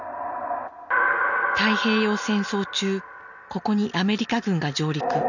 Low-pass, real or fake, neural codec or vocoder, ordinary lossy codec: 7.2 kHz; real; none; none